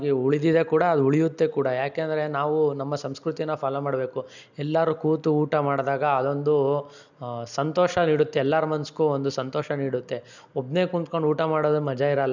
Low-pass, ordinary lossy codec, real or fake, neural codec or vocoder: 7.2 kHz; none; real; none